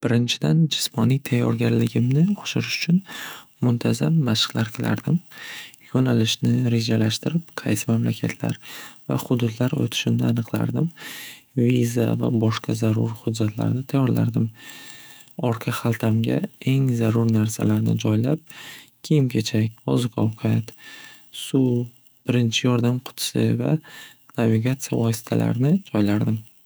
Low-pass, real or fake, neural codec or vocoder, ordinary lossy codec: none; fake; autoencoder, 48 kHz, 128 numbers a frame, DAC-VAE, trained on Japanese speech; none